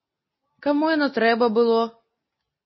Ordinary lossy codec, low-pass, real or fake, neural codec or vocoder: MP3, 24 kbps; 7.2 kHz; real; none